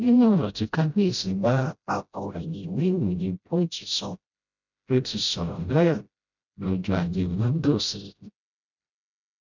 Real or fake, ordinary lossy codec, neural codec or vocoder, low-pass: fake; none; codec, 16 kHz, 0.5 kbps, FreqCodec, smaller model; 7.2 kHz